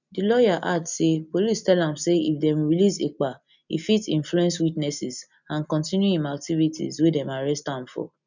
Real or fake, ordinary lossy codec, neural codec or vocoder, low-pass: real; none; none; 7.2 kHz